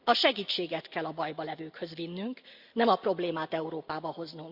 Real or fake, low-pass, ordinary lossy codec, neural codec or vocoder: real; 5.4 kHz; Opus, 64 kbps; none